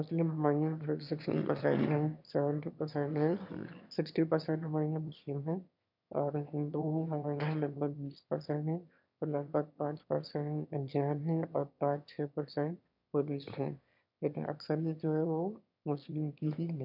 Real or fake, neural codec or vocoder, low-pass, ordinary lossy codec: fake; autoencoder, 22.05 kHz, a latent of 192 numbers a frame, VITS, trained on one speaker; 5.4 kHz; none